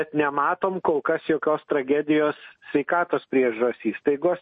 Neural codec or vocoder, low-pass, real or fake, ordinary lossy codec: none; 10.8 kHz; real; MP3, 32 kbps